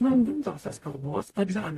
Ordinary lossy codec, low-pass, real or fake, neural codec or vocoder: AAC, 64 kbps; 14.4 kHz; fake; codec, 44.1 kHz, 0.9 kbps, DAC